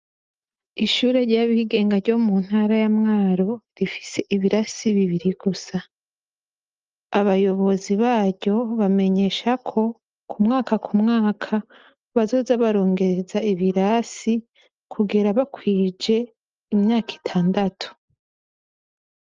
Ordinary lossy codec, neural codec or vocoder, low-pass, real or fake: Opus, 24 kbps; none; 7.2 kHz; real